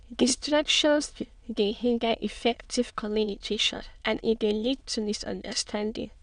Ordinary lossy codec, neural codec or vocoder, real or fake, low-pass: none; autoencoder, 22.05 kHz, a latent of 192 numbers a frame, VITS, trained on many speakers; fake; 9.9 kHz